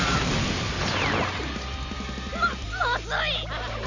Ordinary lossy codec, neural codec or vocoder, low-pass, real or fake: none; none; 7.2 kHz; real